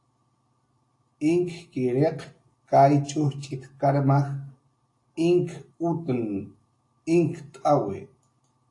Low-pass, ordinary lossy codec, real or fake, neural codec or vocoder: 10.8 kHz; AAC, 48 kbps; real; none